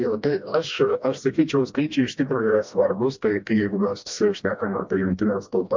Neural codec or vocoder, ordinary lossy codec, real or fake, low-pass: codec, 16 kHz, 1 kbps, FreqCodec, smaller model; MP3, 48 kbps; fake; 7.2 kHz